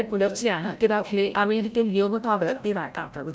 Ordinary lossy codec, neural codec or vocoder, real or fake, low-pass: none; codec, 16 kHz, 0.5 kbps, FreqCodec, larger model; fake; none